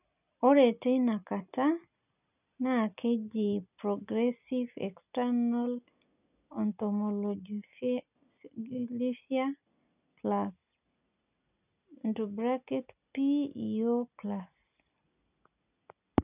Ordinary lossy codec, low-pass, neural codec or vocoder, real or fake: none; 3.6 kHz; none; real